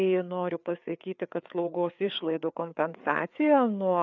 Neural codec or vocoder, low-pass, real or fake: codec, 16 kHz, 4 kbps, FreqCodec, larger model; 7.2 kHz; fake